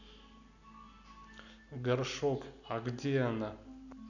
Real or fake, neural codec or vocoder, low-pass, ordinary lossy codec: real; none; 7.2 kHz; none